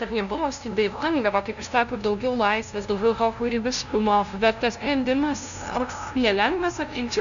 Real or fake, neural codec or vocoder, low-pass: fake; codec, 16 kHz, 0.5 kbps, FunCodec, trained on LibriTTS, 25 frames a second; 7.2 kHz